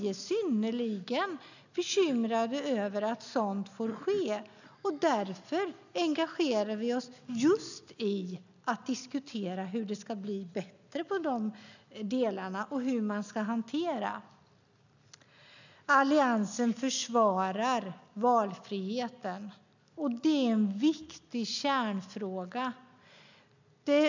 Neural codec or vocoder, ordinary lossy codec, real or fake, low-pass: none; none; real; 7.2 kHz